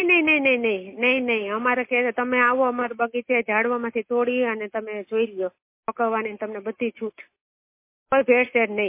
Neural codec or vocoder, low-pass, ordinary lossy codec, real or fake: none; 3.6 kHz; MP3, 24 kbps; real